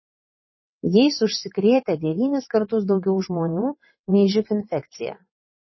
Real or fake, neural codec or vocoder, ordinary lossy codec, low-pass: fake; vocoder, 22.05 kHz, 80 mel bands, WaveNeXt; MP3, 24 kbps; 7.2 kHz